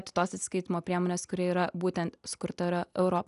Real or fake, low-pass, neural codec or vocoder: real; 10.8 kHz; none